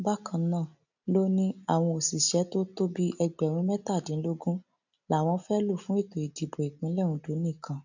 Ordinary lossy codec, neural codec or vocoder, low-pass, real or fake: none; none; 7.2 kHz; real